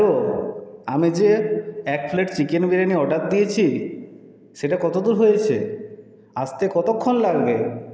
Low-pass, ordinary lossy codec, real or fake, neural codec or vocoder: none; none; real; none